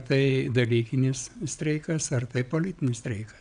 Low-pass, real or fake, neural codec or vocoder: 9.9 kHz; fake; vocoder, 22.05 kHz, 80 mel bands, WaveNeXt